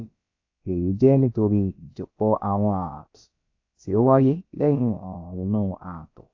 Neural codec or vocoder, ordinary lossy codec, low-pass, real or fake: codec, 16 kHz, about 1 kbps, DyCAST, with the encoder's durations; AAC, 48 kbps; 7.2 kHz; fake